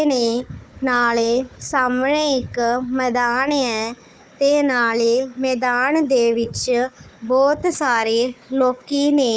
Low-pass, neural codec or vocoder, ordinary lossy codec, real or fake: none; codec, 16 kHz, 4 kbps, FunCodec, trained on Chinese and English, 50 frames a second; none; fake